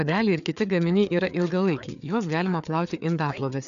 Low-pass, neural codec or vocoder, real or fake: 7.2 kHz; codec, 16 kHz, 4 kbps, FreqCodec, larger model; fake